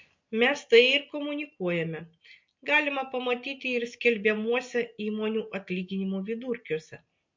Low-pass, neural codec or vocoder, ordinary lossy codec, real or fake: 7.2 kHz; none; MP3, 48 kbps; real